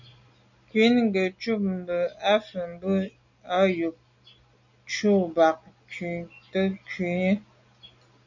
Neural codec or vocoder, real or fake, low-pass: none; real; 7.2 kHz